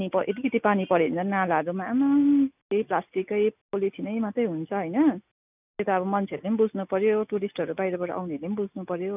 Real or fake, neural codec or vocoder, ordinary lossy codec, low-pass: real; none; none; 3.6 kHz